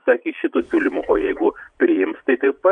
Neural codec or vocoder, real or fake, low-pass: vocoder, 22.05 kHz, 80 mel bands, Vocos; fake; 9.9 kHz